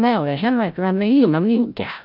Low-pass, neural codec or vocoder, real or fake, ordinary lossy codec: 5.4 kHz; codec, 16 kHz, 0.5 kbps, FreqCodec, larger model; fake; none